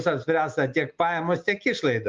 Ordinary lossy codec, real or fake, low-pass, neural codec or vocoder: Opus, 32 kbps; real; 7.2 kHz; none